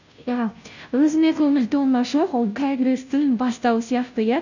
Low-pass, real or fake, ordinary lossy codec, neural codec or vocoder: 7.2 kHz; fake; none; codec, 16 kHz, 0.5 kbps, FunCodec, trained on Chinese and English, 25 frames a second